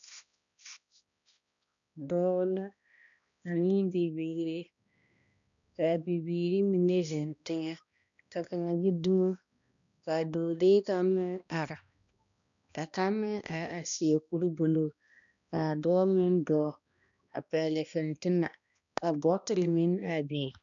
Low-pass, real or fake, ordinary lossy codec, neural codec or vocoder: 7.2 kHz; fake; MP3, 96 kbps; codec, 16 kHz, 1 kbps, X-Codec, HuBERT features, trained on balanced general audio